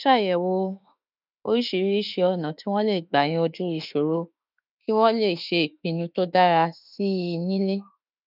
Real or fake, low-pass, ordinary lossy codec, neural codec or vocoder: fake; 5.4 kHz; none; autoencoder, 48 kHz, 32 numbers a frame, DAC-VAE, trained on Japanese speech